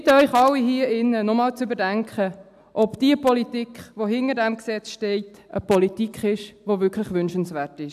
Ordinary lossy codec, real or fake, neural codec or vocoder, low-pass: AAC, 96 kbps; real; none; 14.4 kHz